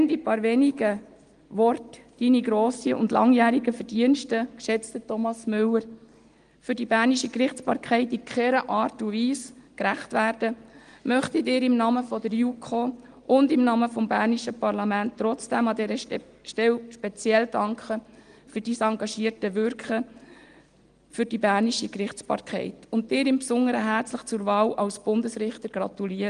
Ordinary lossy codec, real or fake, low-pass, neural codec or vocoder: Opus, 24 kbps; real; 9.9 kHz; none